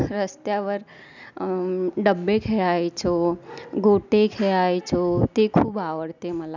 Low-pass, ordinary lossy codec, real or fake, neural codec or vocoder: 7.2 kHz; none; real; none